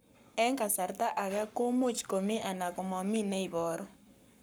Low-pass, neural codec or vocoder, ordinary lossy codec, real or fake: none; codec, 44.1 kHz, 7.8 kbps, Pupu-Codec; none; fake